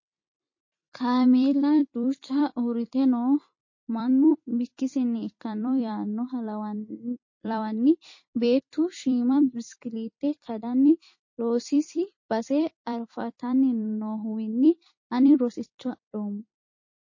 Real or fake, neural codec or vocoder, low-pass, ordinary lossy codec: fake; vocoder, 44.1 kHz, 128 mel bands every 256 samples, BigVGAN v2; 7.2 kHz; MP3, 32 kbps